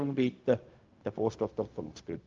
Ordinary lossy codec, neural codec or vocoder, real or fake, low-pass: Opus, 24 kbps; codec, 16 kHz, 0.4 kbps, LongCat-Audio-Codec; fake; 7.2 kHz